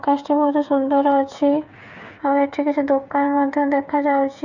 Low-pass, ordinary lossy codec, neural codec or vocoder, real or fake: 7.2 kHz; none; codec, 16 kHz, 8 kbps, FreqCodec, smaller model; fake